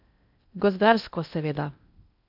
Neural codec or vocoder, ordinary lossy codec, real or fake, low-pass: codec, 16 kHz in and 24 kHz out, 0.6 kbps, FocalCodec, streaming, 2048 codes; none; fake; 5.4 kHz